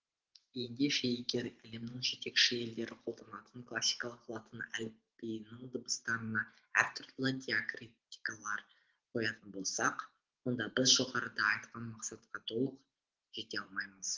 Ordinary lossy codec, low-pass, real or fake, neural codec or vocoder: Opus, 16 kbps; 7.2 kHz; fake; vocoder, 44.1 kHz, 128 mel bands every 512 samples, BigVGAN v2